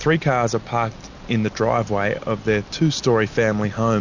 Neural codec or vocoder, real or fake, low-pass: none; real; 7.2 kHz